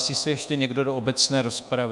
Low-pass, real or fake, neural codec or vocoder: 10.8 kHz; fake; codec, 24 kHz, 1.2 kbps, DualCodec